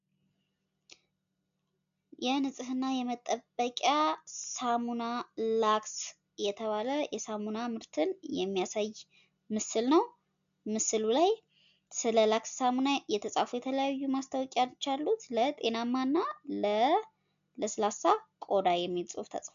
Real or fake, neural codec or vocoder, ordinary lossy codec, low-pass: real; none; AAC, 96 kbps; 7.2 kHz